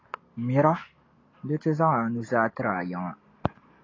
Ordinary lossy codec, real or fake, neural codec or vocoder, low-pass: AAC, 32 kbps; real; none; 7.2 kHz